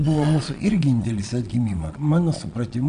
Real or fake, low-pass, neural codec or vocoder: fake; 9.9 kHz; vocoder, 22.05 kHz, 80 mel bands, Vocos